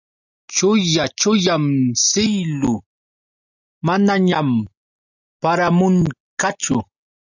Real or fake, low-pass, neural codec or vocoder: real; 7.2 kHz; none